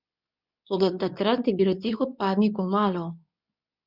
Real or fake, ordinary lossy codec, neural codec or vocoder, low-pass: fake; none; codec, 24 kHz, 0.9 kbps, WavTokenizer, medium speech release version 2; 5.4 kHz